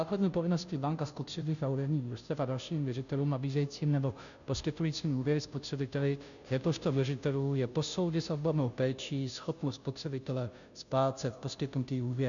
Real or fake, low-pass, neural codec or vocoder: fake; 7.2 kHz; codec, 16 kHz, 0.5 kbps, FunCodec, trained on Chinese and English, 25 frames a second